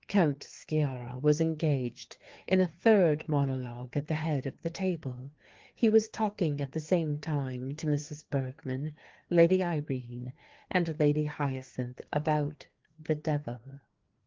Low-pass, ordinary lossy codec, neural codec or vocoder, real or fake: 7.2 kHz; Opus, 16 kbps; codec, 16 kHz, 2 kbps, FreqCodec, larger model; fake